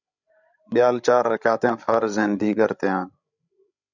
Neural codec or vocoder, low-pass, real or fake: codec, 16 kHz, 16 kbps, FreqCodec, larger model; 7.2 kHz; fake